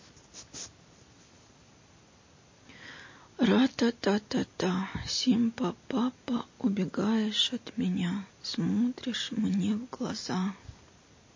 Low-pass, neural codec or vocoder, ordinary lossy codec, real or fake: 7.2 kHz; none; MP3, 32 kbps; real